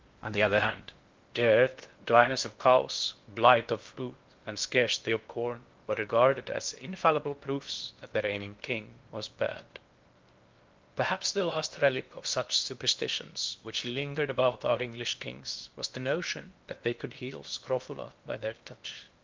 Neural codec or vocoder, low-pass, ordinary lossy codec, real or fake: codec, 16 kHz in and 24 kHz out, 0.6 kbps, FocalCodec, streaming, 4096 codes; 7.2 kHz; Opus, 32 kbps; fake